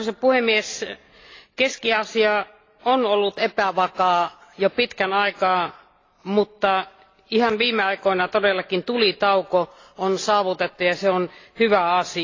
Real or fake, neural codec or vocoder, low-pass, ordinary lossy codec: real; none; 7.2 kHz; AAC, 32 kbps